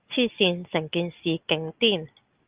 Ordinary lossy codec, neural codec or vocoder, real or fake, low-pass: Opus, 32 kbps; none; real; 3.6 kHz